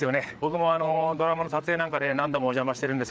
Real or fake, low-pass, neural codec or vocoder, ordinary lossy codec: fake; none; codec, 16 kHz, 4 kbps, FreqCodec, larger model; none